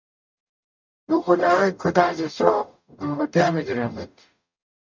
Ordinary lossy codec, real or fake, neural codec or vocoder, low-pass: MP3, 64 kbps; fake; codec, 44.1 kHz, 0.9 kbps, DAC; 7.2 kHz